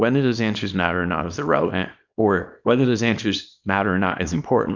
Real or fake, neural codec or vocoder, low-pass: fake; codec, 24 kHz, 0.9 kbps, WavTokenizer, small release; 7.2 kHz